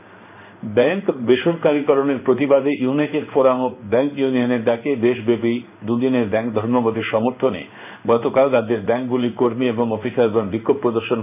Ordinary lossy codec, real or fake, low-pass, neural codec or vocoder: none; fake; 3.6 kHz; codec, 16 kHz in and 24 kHz out, 1 kbps, XY-Tokenizer